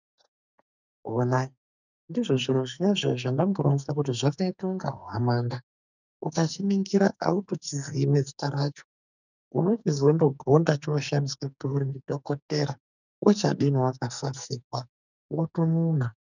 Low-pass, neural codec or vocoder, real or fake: 7.2 kHz; codec, 44.1 kHz, 2.6 kbps, SNAC; fake